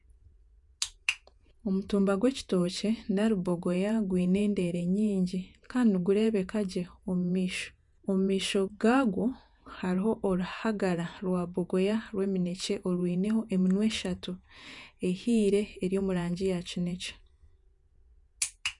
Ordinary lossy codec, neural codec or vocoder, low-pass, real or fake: none; vocoder, 48 kHz, 128 mel bands, Vocos; 10.8 kHz; fake